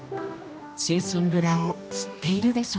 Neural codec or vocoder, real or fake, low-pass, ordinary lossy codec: codec, 16 kHz, 1 kbps, X-Codec, HuBERT features, trained on general audio; fake; none; none